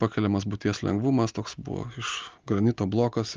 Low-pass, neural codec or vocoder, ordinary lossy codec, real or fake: 7.2 kHz; none; Opus, 24 kbps; real